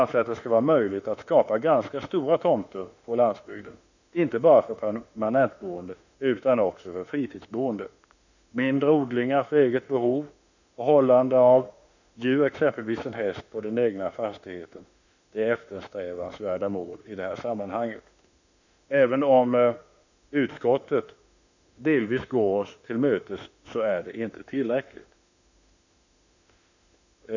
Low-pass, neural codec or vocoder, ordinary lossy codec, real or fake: 7.2 kHz; autoencoder, 48 kHz, 32 numbers a frame, DAC-VAE, trained on Japanese speech; none; fake